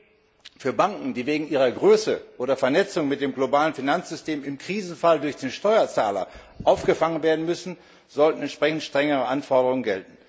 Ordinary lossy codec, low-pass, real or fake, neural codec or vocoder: none; none; real; none